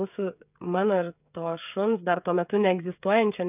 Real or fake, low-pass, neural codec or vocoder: fake; 3.6 kHz; codec, 16 kHz, 8 kbps, FreqCodec, smaller model